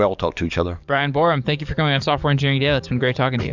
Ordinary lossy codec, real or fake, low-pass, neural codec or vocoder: Opus, 64 kbps; fake; 7.2 kHz; codec, 44.1 kHz, 7.8 kbps, DAC